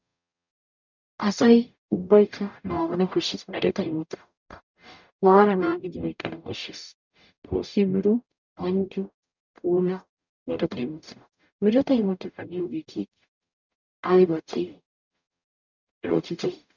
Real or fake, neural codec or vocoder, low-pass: fake; codec, 44.1 kHz, 0.9 kbps, DAC; 7.2 kHz